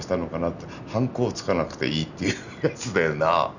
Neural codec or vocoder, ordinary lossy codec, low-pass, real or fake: none; none; 7.2 kHz; real